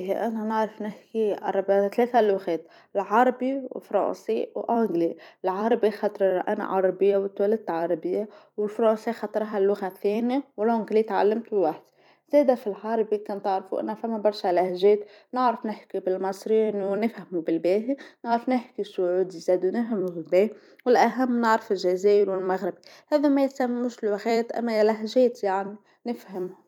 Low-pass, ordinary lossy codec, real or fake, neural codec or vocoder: 19.8 kHz; none; fake; vocoder, 44.1 kHz, 128 mel bands every 256 samples, BigVGAN v2